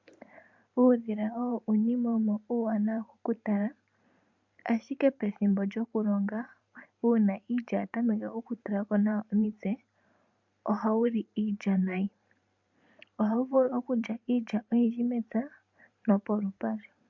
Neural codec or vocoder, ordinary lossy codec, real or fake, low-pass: vocoder, 44.1 kHz, 128 mel bands every 512 samples, BigVGAN v2; MP3, 64 kbps; fake; 7.2 kHz